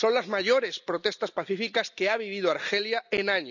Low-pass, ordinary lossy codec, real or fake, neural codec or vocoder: 7.2 kHz; none; real; none